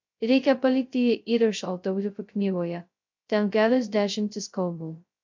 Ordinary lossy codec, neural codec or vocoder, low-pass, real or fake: MP3, 64 kbps; codec, 16 kHz, 0.2 kbps, FocalCodec; 7.2 kHz; fake